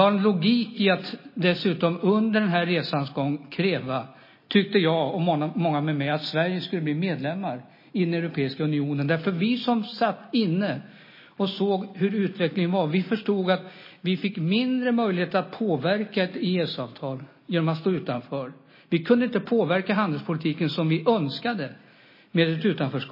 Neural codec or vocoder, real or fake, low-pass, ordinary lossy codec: none; real; 5.4 kHz; MP3, 24 kbps